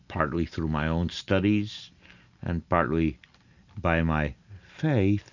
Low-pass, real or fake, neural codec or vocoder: 7.2 kHz; real; none